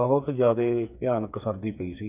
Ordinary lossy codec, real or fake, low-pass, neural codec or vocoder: none; fake; 3.6 kHz; codec, 16 kHz, 4 kbps, FreqCodec, smaller model